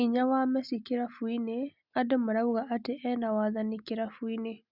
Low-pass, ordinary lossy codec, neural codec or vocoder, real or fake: 5.4 kHz; Opus, 64 kbps; none; real